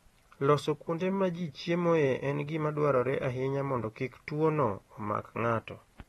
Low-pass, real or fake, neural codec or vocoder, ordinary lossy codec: 19.8 kHz; real; none; AAC, 32 kbps